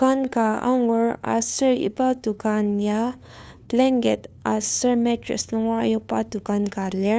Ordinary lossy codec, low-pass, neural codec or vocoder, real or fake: none; none; codec, 16 kHz, 2 kbps, FunCodec, trained on LibriTTS, 25 frames a second; fake